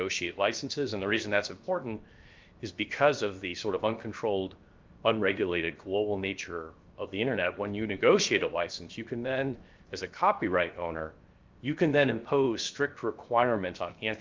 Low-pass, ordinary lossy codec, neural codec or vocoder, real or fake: 7.2 kHz; Opus, 24 kbps; codec, 16 kHz, 0.7 kbps, FocalCodec; fake